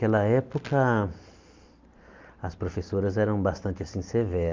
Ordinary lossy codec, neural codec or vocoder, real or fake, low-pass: Opus, 32 kbps; none; real; 7.2 kHz